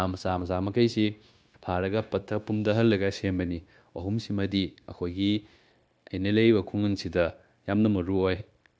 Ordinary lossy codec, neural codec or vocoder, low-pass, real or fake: none; codec, 16 kHz, 0.9 kbps, LongCat-Audio-Codec; none; fake